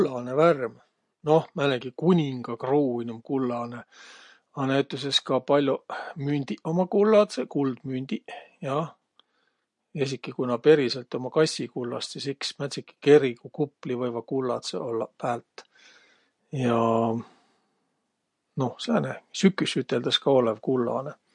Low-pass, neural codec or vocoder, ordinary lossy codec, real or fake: 10.8 kHz; none; MP3, 48 kbps; real